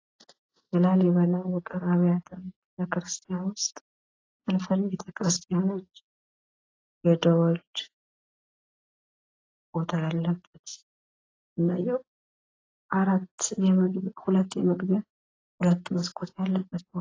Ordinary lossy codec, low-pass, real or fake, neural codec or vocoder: AAC, 32 kbps; 7.2 kHz; real; none